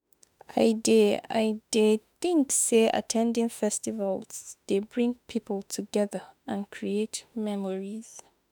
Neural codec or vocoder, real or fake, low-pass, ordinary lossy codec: autoencoder, 48 kHz, 32 numbers a frame, DAC-VAE, trained on Japanese speech; fake; none; none